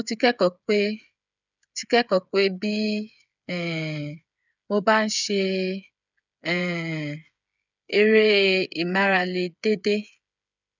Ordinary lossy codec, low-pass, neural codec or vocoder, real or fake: none; 7.2 kHz; codec, 16 kHz, 8 kbps, FreqCodec, smaller model; fake